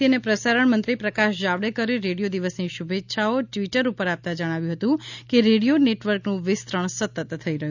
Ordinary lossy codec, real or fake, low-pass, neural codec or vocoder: none; real; 7.2 kHz; none